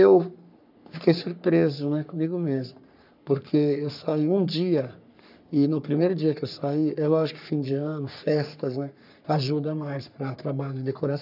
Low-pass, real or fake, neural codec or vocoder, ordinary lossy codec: 5.4 kHz; fake; codec, 44.1 kHz, 3.4 kbps, Pupu-Codec; none